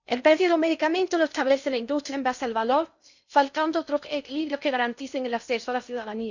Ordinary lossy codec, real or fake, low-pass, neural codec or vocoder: none; fake; 7.2 kHz; codec, 16 kHz in and 24 kHz out, 0.6 kbps, FocalCodec, streaming, 4096 codes